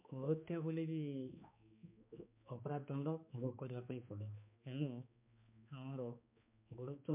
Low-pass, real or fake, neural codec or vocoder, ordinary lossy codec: 3.6 kHz; fake; codec, 16 kHz, 2 kbps, X-Codec, HuBERT features, trained on balanced general audio; MP3, 32 kbps